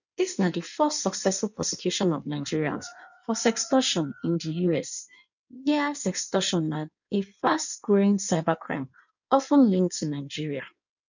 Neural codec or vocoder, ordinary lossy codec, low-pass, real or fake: codec, 16 kHz in and 24 kHz out, 1.1 kbps, FireRedTTS-2 codec; none; 7.2 kHz; fake